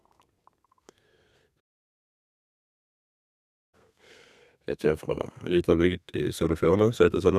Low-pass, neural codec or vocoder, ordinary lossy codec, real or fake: 14.4 kHz; codec, 32 kHz, 1.9 kbps, SNAC; none; fake